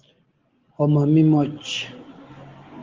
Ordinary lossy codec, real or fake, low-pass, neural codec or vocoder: Opus, 16 kbps; real; 7.2 kHz; none